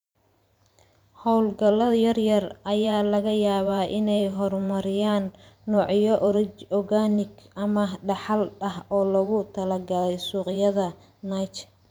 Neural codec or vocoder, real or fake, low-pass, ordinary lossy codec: vocoder, 44.1 kHz, 128 mel bands every 512 samples, BigVGAN v2; fake; none; none